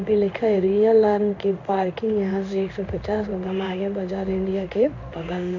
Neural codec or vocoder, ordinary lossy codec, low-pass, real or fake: codec, 16 kHz in and 24 kHz out, 1 kbps, XY-Tokenizer; none; 7.2 kHz; fake